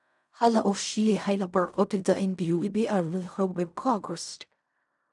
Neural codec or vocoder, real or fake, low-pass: codec, 16 kHz in and 24 kHz out, 0.4 kbps, LongCat-Audio-Codec, fine tuned four codebook decoder; fake; 10.8 kHz